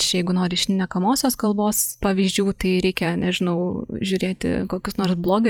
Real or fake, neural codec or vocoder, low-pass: fake; vocoder, 44.1 kHz, 128 mel bands every 512 samples, BigVGAN v2; 19.8 kHz